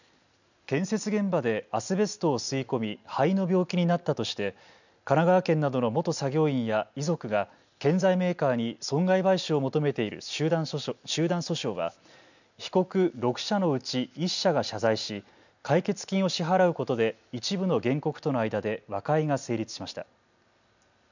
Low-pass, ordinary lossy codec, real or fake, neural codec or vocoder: 7.2 kHz; none; real; none